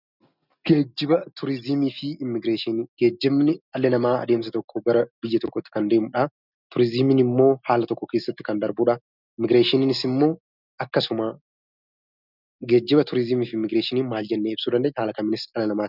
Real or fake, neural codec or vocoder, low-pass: real; none; 5.4 kHz